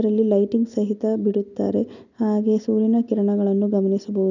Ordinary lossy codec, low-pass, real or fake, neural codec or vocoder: none; 7.2 kHz; real; none